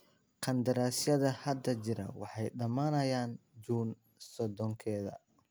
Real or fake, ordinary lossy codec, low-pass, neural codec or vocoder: real; none; none; none